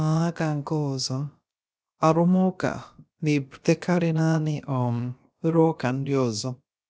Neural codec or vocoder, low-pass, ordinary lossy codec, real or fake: codec, 16 kHz, about 1 kbps, DyCAST, with the encoder's durations; none; none; fake